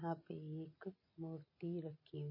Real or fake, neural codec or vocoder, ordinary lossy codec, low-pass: real; none; MP3, 24 kbps; 5.4 kHz